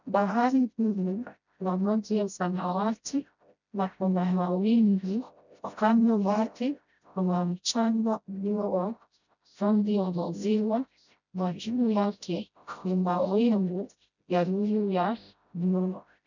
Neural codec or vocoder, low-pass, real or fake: codec, 16 kHz, 0.5 kbps, FreqCodec, smaller model; 7.2 kHz; fake